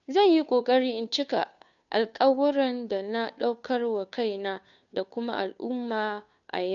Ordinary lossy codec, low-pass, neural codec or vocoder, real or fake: none; 7.2 kHz; codec, 16 kHz, 2 kbps, FunCodec, trained on Chinese and English, 25 frames a second; fake